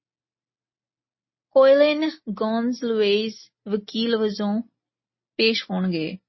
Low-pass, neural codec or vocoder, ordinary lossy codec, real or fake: 7.2 kHz; none; MP3, 24 kbps; real